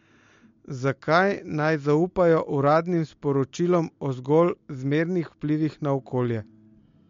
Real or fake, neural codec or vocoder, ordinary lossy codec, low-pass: real; none; MP3, 48 kbps; 7.2 kHz